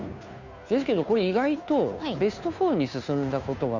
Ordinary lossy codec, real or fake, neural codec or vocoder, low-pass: none; fake; codec, 16 kHz, 2 kbps, FunCodec, trained on Chinese and English, 25 frames a second; 7.2 kHz